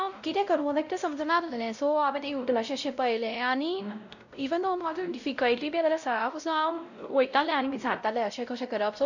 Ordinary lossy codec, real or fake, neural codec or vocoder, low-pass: none; fake; codec, 16 kHz, 0.5 kbps, X-Codec, WavLM features, trained on Multilingual LibriSpeech; 7.2 kHz